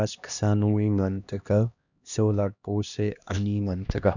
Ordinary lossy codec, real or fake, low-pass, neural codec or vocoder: none; fake; 7.2 kHz; codec, 16 kHz, 1 kbps, X-Codec, HuBERT features, trained on LibriSpeech